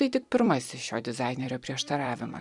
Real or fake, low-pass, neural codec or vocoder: fake; 10.8 kHz; vocoder, 44.1 kHz, 128 mel bands every 256 samples, BigVGAN v2